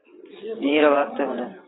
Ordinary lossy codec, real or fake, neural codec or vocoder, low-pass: AAC, 16 kbps; fake; vocoder, 22.05 kHz, 80 mel bands, WaveNeXt; 7.2 kHz